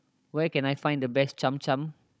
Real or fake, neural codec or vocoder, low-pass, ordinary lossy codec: fake; codec, 16 kHz, 16 kbps, FunCodec, trained on Chinese and English, 50 frames a second; none; none